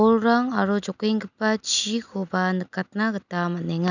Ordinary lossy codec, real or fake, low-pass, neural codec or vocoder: none; real; 7.2 kHz; none